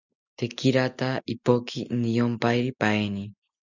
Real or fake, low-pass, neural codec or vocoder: real; 7.2 kHz; none